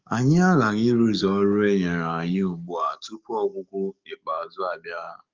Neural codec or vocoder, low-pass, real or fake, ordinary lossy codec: codec, 44.1 kHz, 7.8 kbps, DAC; 7.2 kHz; fake; Opus, 32 kbps